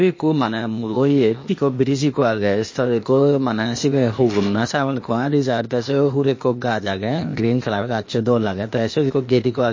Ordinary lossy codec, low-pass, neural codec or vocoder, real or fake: MP3, 32 kbps; 7.2 kHz; codec, 16 kHz, 0.8 kbps, ZipCodec; fake